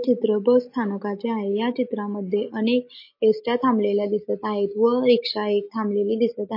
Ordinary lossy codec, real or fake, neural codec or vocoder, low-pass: MP3, 32 kbps; real; none; 5.4 kHz